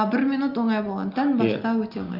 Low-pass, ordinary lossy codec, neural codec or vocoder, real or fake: 5.4 kHz; Opus, 24 kbps; none; real